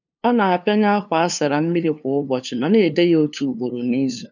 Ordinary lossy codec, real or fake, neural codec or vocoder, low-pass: none; fake; codec, 16 kHz, 2 kbps, FunCodec, trained on LibriTTS, 25 frames a second; 7.2 kHz